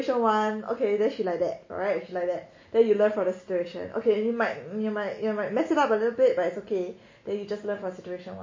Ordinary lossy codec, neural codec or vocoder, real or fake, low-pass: MP3, 32 kbps; none; real; 7.2 kHz